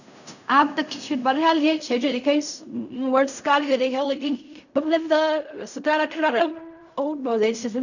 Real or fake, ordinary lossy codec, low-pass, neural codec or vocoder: fake; none; 7.2 kHz; codec, 16 kHz in and 24 kHz out, 0.4 kbps, LongCat-Audio-Codec, fine tuned four codebook decoder